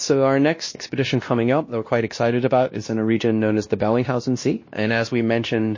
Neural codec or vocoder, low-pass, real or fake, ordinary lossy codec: codec, 16 kHz, 1 kbps, X-Codec, WavLM features, trained on Multilingual LibriSpeech; 7.2 kHz; fake; MP3, 32 kbps